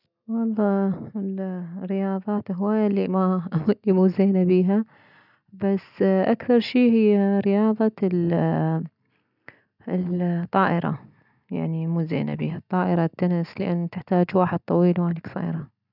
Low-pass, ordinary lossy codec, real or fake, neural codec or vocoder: 5.4 kHz; none; real; none